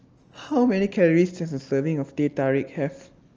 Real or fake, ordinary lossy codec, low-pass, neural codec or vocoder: real; Opus, 24 kbps; 7.2 kHz; none